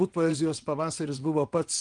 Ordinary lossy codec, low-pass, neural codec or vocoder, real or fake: Opus, 24 kbps; 9.9 kHz; vocoder, 22.05 kHz, 80 mel bands, WaveNeXt; fake